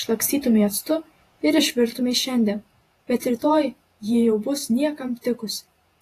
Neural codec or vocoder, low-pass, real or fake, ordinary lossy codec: vocoder, 48 kHz, 128 mel bands, Vocos; 14.4 kHz; fake; AAC, 48 kbps